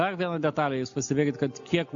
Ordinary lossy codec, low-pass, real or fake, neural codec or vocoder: AAC, 64 kbps; 7.2 kHz; real; none